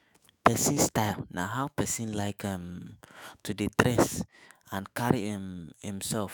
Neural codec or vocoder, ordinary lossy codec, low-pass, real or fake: autoencoder, 48 kHz, 128 numbers a frame, DAC-VAE, trained on Japanese speech; none; none; fake